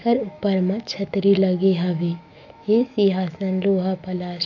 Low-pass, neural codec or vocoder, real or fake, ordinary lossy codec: 7.2 kHz; autoencoder, 48 kHz, 128 numbers a frame, DAC-VAE, trained on Japanese speech; fake; none